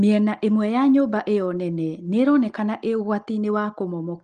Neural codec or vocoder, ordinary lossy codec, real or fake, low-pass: none; Opus, 24 kbps; real; 9.9 kHz